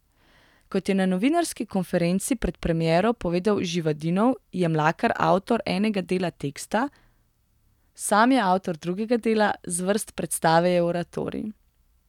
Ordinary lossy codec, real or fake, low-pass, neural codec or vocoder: none; real; 19.8 kHz; none